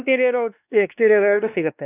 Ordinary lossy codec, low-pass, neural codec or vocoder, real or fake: none; 3.6 kHz; codec, 16 kHz, 2 kbps, X-Codec, WavLM features, trained on Multilingual LibriSpeech; fake